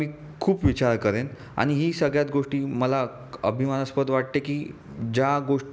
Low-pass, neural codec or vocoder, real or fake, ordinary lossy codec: none; none; real; none